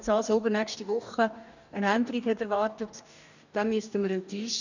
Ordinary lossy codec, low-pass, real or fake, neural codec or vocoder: none; 7.2 kHz; fake; codec, 44.1 kHz, 2.6 kbps, DAC